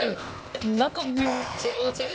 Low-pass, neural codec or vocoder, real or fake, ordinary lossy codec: none; codec, 16 kHz, 0.8 kbps, ZipCodec; fake; none